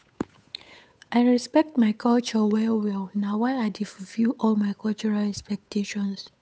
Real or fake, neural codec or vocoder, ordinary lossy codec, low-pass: fake; codec, 16 kHz, 8 kbps, FunCodec, trained on Chinese and English, 25 frames a second; none; none